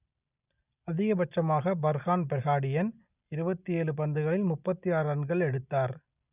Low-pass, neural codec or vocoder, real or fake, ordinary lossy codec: 3.6 kHz; none; real; none